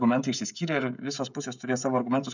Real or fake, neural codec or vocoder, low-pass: fake; codec, 16 kHz, 16 kbps, FreqCodec, smaller model; 7.2 kHz